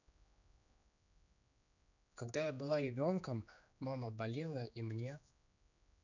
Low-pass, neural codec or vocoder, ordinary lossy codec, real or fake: 7.2 kHz; codec, 16 kHz, 2 kbps, X-Codec, HuBERT features, trained on general audio; none; fake